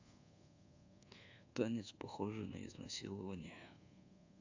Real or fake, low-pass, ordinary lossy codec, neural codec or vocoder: fake; 7.2 kHz; AAC, 48 kbps; codec, 24 kHz, 1.2 kbps, DualCodec